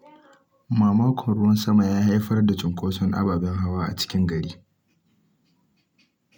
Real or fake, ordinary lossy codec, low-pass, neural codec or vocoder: real; none; 19.8 kHz; none